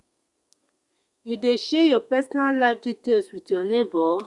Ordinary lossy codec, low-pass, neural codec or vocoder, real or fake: Opus, 64 kbps; 10.8 kHz; codec, 32 kHz, 1.9 kbps, SNAC; fake